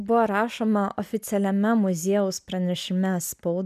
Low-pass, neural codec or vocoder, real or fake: 14.4 kHz; none; real